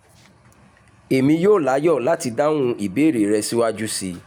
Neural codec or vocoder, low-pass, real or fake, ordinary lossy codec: none; 19.8 kHz; real; none